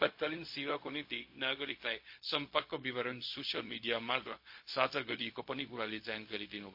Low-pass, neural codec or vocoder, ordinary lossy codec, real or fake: 5.4 kHz; codec, 16 kHz, 0.4 kbps, LongCat-Audio-Codec; MP3, 32 kbps; fake